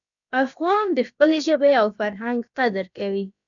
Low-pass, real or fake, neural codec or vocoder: 7.2 kHz; fake; codec, 16 kHz, about 1 kbps, DyCAST, with the encoder's durations